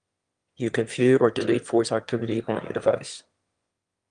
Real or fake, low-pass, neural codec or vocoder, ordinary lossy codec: fake; 9.9 kHz; autoencoder, 22.05 kHz, a latent of 192 numbers a frame, VITS, trained on one speaker; Opus, 32 kbps